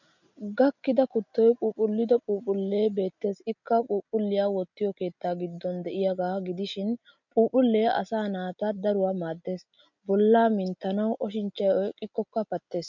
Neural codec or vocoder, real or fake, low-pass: none; real; 7.2 kHz